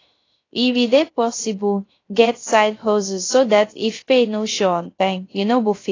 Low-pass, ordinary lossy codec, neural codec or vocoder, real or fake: 7.2 kHz; AAC, 32 kbps; codec, 16 kHz, 0.3 kbps, FocalCodec; fake